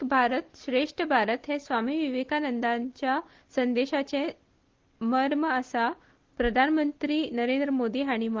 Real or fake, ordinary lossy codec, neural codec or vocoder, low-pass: real; Opus, 16 kbps; none; 7.2 kHz